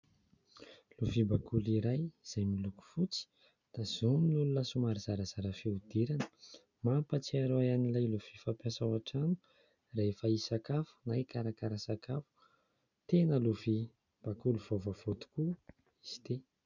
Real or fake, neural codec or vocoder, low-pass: real; none; 7.2 kHz